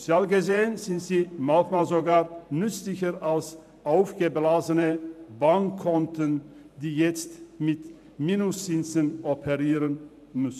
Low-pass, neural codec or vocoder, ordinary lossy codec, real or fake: 14.4 kHz; vocoder, 44.1 kHz, 128 mel bands every 512 samples, BigVGAN v2; none; fake